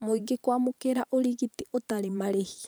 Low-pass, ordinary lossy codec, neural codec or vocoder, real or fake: none; none; vocoder, 44.1 kHz, 128 mel bands every 512 samples, BigVGAN v2; fake